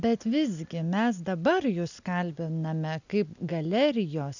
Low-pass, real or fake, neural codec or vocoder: 7.2 kHz; real; none